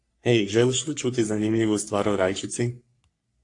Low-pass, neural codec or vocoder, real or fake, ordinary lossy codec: 10.8 kHz; codec, 44.1 kHz, 3.4 kbps, Pupu-Codec; fake; AAC, 48 kbps